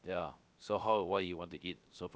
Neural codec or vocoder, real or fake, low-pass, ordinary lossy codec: codec, 16 kHz, 0.7 kbps, FocalCodec; fake; none; none